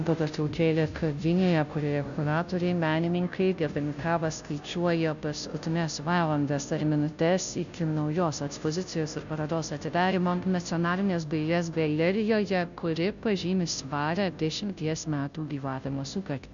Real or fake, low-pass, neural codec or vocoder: fake; 7.2 kHz; codec, 16 kHz, 0.5 kbps, FunCodec, trained on Chinese and English, 25 frames a second